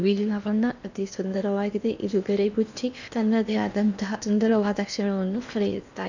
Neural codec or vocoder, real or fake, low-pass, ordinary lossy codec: codec, 16 kHz in and 24 kHz out, 0.8 kbps, FocalCodec, streaming, 65536 codes; fake; 7.2 kHz; none